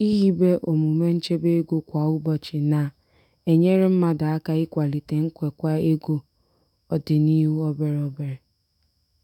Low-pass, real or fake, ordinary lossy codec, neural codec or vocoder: 19.8 kHz; fake; none; autoencoder, 48 kHz, 128 numbers a frame, DAC-VAE, trained on Japanese speech